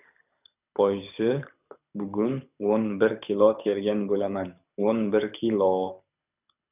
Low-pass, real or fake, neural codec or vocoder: 3.6 kHz; fake; codec, 24 kHz, 6 kbps, HILCodec